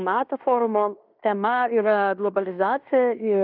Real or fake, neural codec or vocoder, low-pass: fake; codec, 16 kHz in and 24 kHz out, 0.9 kbps, LongCat-Audio-Codec, fine tuned four codebook decoder; 5.4 kHz